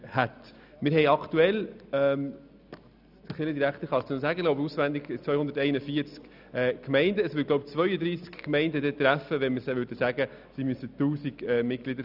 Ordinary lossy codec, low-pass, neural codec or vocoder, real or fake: none; 5.4 kHz; none; real